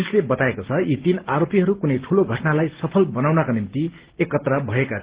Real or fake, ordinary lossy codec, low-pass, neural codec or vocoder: real; Opus, 16 kbps; 3.6 kHz; none